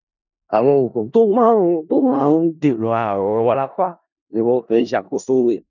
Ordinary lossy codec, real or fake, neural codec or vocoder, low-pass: none; fake; codec, 16 kHz in and 24 kHz out, 0.4 kbps, LongCat-Audio-Codec, four codebook decoder; 7.2 kHz